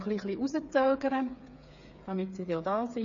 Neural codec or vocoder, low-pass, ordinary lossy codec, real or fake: codec, 16 kHz, 8 kbps, FreqCodec, smaller model; 7.2 kHz; AAC, 48 kbps; fake